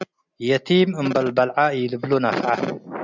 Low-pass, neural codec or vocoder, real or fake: 7.2 kHz; none; real